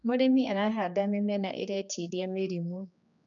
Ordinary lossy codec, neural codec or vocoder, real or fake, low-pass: MP3, 96 kbps; codec, 16 kHz, 2 kbps, X-Codec, HuBERT features, trained on general audio; fake; 7.2 kHz